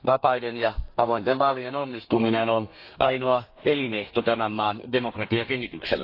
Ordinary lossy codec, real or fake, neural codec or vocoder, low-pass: AAC, 32 kbps; fake; codec, 32 kHz, 1.9 kbps, SNAC; 5.4 kHz